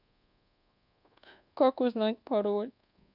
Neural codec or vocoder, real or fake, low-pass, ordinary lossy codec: codec, 24 kHz, 1.2 kbps, DualCodec; fake; 5.4 kHz; none